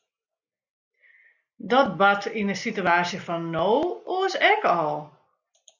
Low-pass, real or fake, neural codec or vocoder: 7.2 kHz; real; none